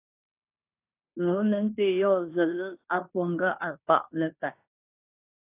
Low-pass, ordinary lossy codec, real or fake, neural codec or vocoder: 3.6 kHz; AAC, 24 kbps; fake; codec, 16 kHz in and 24 kHz out, 0.9 kbps, LongCat-Audio-Codec, fine tuned four codebook decoder